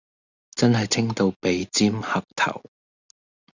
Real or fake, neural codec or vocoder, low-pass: real; none; 7.2 kHz